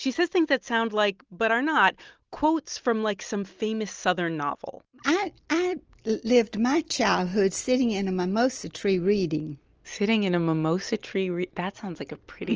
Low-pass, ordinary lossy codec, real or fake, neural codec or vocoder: 7.2 kHz; Opus, 24 kbps; real; none